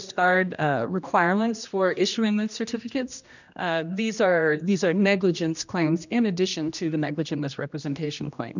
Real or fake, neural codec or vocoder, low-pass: fake; codec, 16 kHz, 1 kbps, X-Codec, HuBERT features, trained on general audio; 7.2 kHz